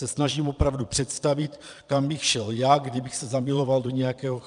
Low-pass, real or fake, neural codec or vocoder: 9.9 kHz; fake; vocoder, 22.05 kHz, 80 mel bands, Vocos